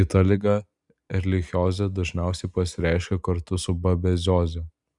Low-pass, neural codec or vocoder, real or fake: 10.8 kHz; none; real